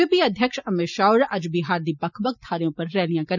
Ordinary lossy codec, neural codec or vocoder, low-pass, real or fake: none; none; 7.2 kHz; real